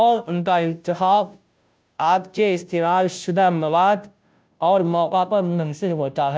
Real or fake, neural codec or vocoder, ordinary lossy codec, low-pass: fake; codec, 16 kHz, 0.5 kbps, FunCodec, trained on Chinese and English, 25 frames a second; none; none